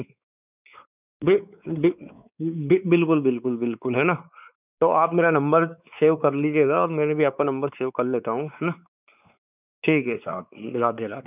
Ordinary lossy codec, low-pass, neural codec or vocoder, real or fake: none; 3.6 kHz; codec, 16 kHz, 4 kbps, X-Codec, WavLM features, trained on Multilingual LibriSpeech; fake